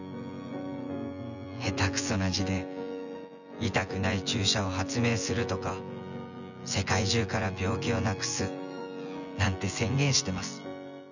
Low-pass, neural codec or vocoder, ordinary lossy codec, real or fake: 7.2 kHz; vocoder, 24 kHz, 100 mel bands, Vocos; none; fake